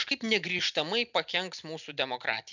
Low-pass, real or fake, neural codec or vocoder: 7.2 kHz; real; none